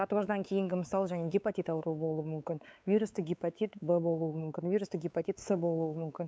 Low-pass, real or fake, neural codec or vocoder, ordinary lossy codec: none; fake; codec, 16 kHz, 4 kbps, X-Codec, WavLM features, trained on Multilingual LibriSpeech; none